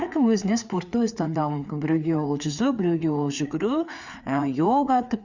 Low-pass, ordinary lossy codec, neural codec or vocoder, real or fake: 7.2 kHz; Opus, 64 kbps; codec, 16 kHz, 4 kbps, FreqCodec, larger model; fake